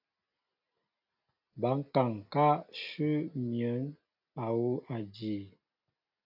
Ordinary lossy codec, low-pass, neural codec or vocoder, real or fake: AAC, 48 kbps; 5.4 kHz; none; real